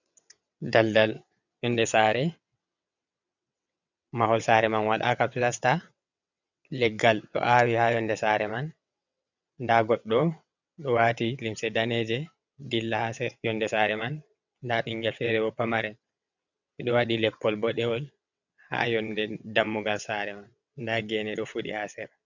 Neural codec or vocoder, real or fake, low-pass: vocoder, 22.05 kHz, 80 mel bands, WaveNeXt; fake; 7.2 kHz